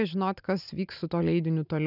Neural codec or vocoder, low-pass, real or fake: none; 5.4 kHz; real